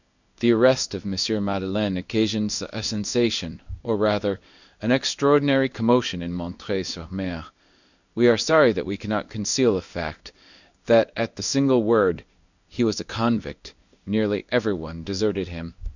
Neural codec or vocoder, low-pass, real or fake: codec, 16 kHz in and 24 kHz out, 1 kbps, XY-Tokenizer; 7.2 kHz; fake